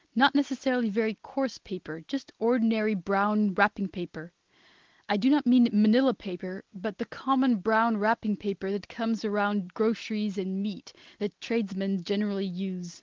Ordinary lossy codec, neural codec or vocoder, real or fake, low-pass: Opus, 16 kbps; none; real; 7.2 kHz